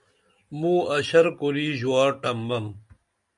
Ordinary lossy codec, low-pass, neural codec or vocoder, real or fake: MP3, 96 kbps; 10.8 kHz; none; real